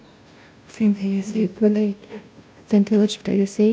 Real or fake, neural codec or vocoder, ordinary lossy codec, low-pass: fake; codec, 16 kHz, 0.5 kbps, FunCodec, trained on Chinese and English, 25 frames a second; none; none